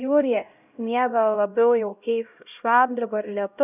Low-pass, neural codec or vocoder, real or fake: 3.6 kHz; codec, 16 kHz, 0.5 kbps, X-Codec, HuBERT features, trained on LibriSpeech; fake